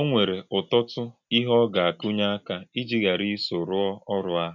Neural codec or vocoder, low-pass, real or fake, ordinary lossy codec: none; 7.2 kHz; real; none